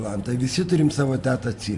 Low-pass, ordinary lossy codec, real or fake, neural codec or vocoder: 10.8 kHz; MP3, 64 kbps; fake; vocoder, 44.1 kHz, 128 mel bands every 512 samples, BigVGAN v2